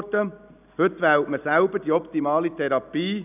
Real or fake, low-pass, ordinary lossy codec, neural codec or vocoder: real; 3.6 kHz; none; none